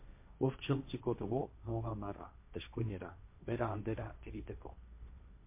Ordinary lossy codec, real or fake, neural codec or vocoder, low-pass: MP3, 24 kbps; fake; codec, 16 kHz, 1.1 kbps, Voila-Tokenizer; 3.6 kHz